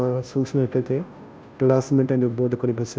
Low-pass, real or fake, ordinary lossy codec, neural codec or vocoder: none; fake; none; codec, 16 kHz, 0.5 kbps, FunCodec, trained on Chinese and English, 25 frames a second